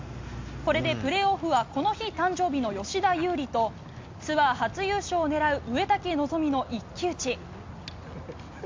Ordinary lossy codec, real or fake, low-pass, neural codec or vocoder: MP3, 64 kbps; real; 7.2 kHz; none